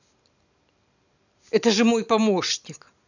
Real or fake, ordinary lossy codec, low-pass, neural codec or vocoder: real; none; 7.2 kHz; none